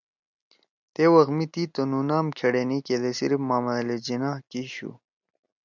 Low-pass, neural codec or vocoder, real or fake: 7.2 kHz; none; real